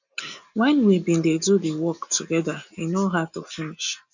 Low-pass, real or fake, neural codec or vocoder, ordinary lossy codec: 7.2 kHz; real; none; none